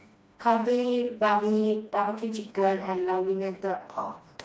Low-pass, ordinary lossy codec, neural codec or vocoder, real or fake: none; none; codec, 16 kHz, 1 kbps, FreqCodec, smaller model; fake